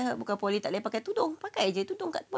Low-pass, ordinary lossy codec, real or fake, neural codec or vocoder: none; none; real; none